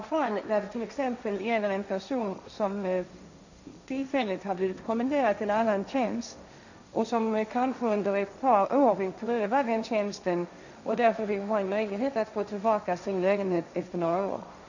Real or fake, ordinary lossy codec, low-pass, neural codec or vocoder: fake; none; 7.2 kHz; codec, 16 kHz, 1.1 kbps, Voila-Tokenizer